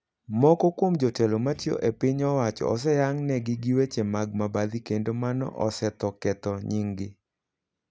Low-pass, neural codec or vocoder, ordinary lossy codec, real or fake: none; none; none; real